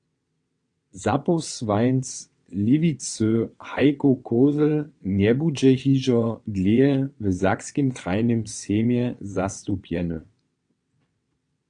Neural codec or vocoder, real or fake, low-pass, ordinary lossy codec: vocoder, 22.05 kHz, 80 mel bands, WaveNeXt; fake; 9.9 kHz; AAC, 48 kbps